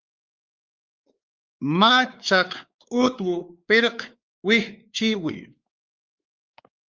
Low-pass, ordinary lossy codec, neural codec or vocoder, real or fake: 7.2 kHz; Opus, 32 kbps; codec, 16 kHz, 4 kbps, X-Codec, WavLM features, trained on Multilingual LibriSpeech; fake